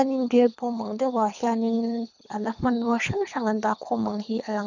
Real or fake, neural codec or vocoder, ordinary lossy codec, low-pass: fake; codec, 24 kHz, 3 kbps, HILCodec; none; 7.2 kHz